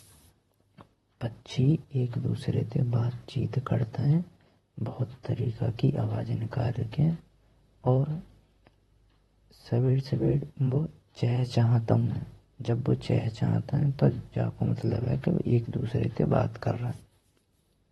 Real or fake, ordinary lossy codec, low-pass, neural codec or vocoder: fake; AAC, 32 kbps; 19.8 kHz; vocoder, 44.1 kHz, 128 mel bands, Pupu-Vocoder